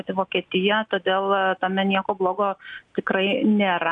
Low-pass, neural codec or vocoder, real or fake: 9.9 kHz; none; real